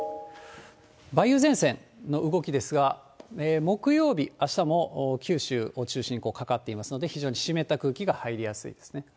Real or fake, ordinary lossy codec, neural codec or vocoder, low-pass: real; none; none; none